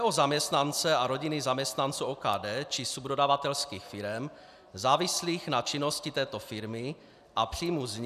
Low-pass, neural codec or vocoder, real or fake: 14.4 kHz; none; real